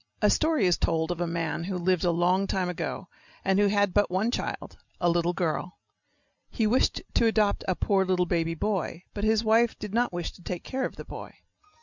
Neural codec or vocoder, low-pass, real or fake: none; 7.2 kHz; real